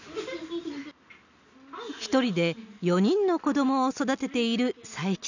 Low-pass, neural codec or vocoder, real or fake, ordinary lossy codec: 7.2 kHz; none; real; none